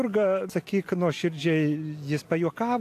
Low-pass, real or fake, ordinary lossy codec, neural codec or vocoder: 14.4 kHz; real; AAC, 64 kbps; none